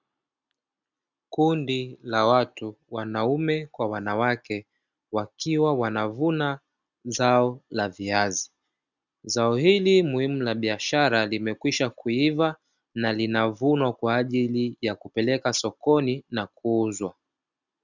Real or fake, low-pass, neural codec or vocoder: real; 7.2 kHz; none